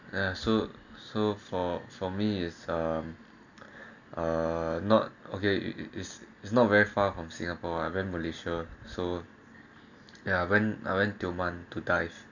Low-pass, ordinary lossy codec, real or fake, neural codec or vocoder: 7.2 kHz; none; real; none